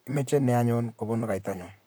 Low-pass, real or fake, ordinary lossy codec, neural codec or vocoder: none; fake; none; vocoder, 44.1 kHz, 128 mel bands, Pupu-Vocoder